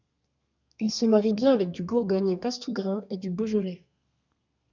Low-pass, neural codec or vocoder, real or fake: 7.2 kHz; codec, 32 kHz, 1.9 kbps, SNAC; fake